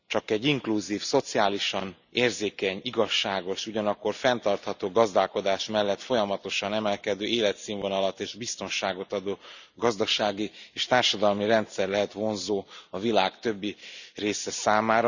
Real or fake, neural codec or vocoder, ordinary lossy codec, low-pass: real; none; none; 7.2 kHz